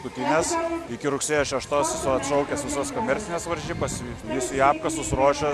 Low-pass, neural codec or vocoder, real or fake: 14.4 kHz; none; real